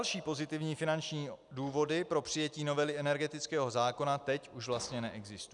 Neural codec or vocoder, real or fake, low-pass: none; real; 10.8 kHz